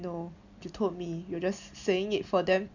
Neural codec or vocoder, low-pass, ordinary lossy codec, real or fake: none; 7.2 kHz; none; real